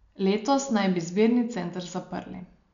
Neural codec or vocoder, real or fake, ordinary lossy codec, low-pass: none; real; none; 7.2 kHz